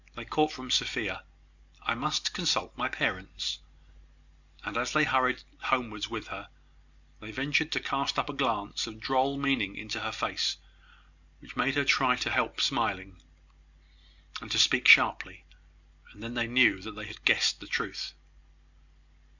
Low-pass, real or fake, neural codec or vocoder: 7.2 kHz; real; none